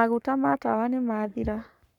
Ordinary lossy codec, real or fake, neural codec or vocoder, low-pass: none; fake; codec, 44.1 kHz, 7.8 kbps, Pupu-Codec; 19.8 kHz